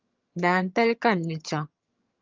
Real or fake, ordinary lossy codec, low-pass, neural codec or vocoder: fake; Opus, 24 kbps; 7.2 kHz; vocoder, 22.05 kHz, 80 mel bands, HiFi-GAN